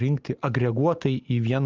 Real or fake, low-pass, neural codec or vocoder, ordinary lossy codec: real; 7.2 kHz; none; Opus, 24 kbps